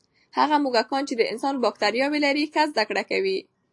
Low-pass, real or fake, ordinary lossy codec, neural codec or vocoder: 10.8 kHz; real; AAC, 64 kbps; none